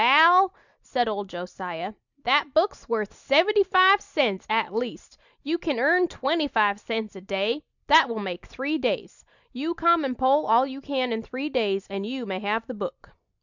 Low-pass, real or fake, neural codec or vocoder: 7.2 kHz; real; none